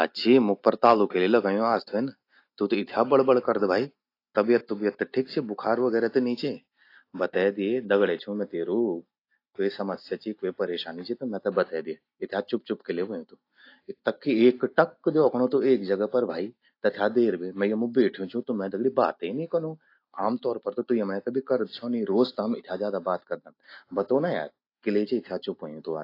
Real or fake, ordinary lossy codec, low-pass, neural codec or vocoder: real; AAC, 32 kbps; 5.4 kHz; none